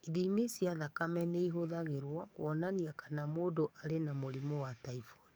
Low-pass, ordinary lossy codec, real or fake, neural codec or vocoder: none; none; fake; codec, 44.1 kHz, 7.8 kbps, DAC